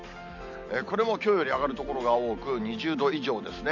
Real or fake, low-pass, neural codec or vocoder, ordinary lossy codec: real; 7.2 kHz; none; none